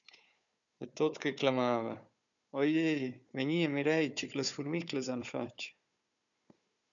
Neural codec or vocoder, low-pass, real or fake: codec, 16 kHz, 4 kbps, FunCodec, trained on Chinese and English, 50 frames a second; 7.2 kHz; fake